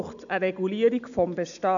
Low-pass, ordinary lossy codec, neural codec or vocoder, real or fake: 7.2 kHz; none; none; real